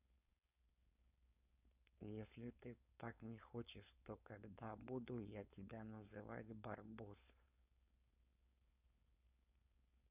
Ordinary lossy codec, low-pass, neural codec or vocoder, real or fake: none; 3.6 kHz; codec, 16 kHz, 4.8 kbps, FACodec; fake